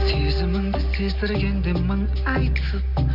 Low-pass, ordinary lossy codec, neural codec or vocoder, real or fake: 5.4 kHz; MP3, 48 kbps; none; real